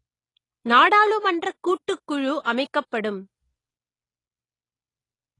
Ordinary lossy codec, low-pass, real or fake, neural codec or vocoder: AAC, 32 kbps; 10.8 kHz; real; none